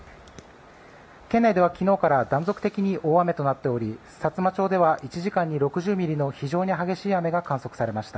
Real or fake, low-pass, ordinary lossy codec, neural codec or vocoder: real; none; none; none